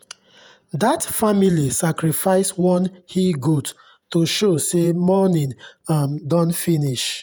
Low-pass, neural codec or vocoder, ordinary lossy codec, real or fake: none; vocoder, 48 kHz, 128 mel bands, Vocos; none; fake